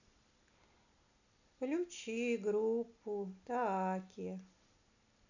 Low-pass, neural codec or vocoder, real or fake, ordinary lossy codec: 7.2 kHz; none; real; none